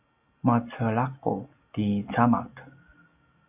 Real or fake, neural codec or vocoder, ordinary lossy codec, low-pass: real; none; AAC, 32 kbps; 3.6 kHz